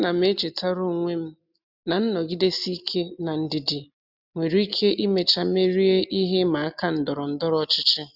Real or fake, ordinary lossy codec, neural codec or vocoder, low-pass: real; none; none; 5.4 kHz